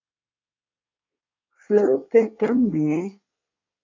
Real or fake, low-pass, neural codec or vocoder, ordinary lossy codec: fake; 7.2 kHz; codec, 24 kHz, 1 kbps, SNAC; MP3, 64 kbps